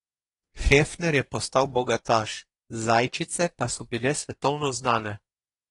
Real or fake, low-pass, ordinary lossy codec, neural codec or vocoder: fake; 10.8 kHz; AAC, 32 kbps; codec, 24 kHz, 1 kbps, SNAC